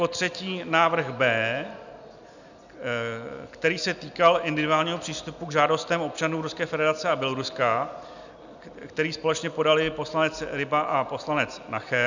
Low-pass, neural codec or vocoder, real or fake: 7.2 kHz; none; real